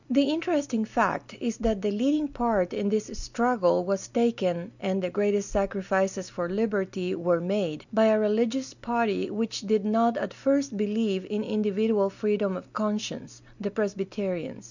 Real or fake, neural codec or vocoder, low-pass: real; none; 7.2 kHz